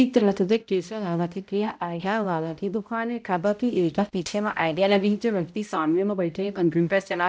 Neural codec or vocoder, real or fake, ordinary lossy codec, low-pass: codec, 16 kHz, 0.5 kbps, X-Codec, HuBERT features, trained on balanced general audio; fake; none; none